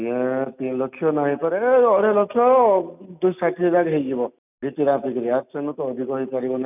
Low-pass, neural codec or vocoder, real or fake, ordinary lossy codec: 3.6 kHz; none; real; none